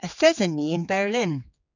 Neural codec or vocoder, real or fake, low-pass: codec, 16 kHz, 4 kbps, X-Codec, HuBERT features, trained on general audio; fake; 7.2 kHz